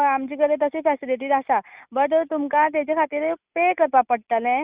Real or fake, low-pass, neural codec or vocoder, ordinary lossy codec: real; 3.6 kHz; none; Opus, 64 kbps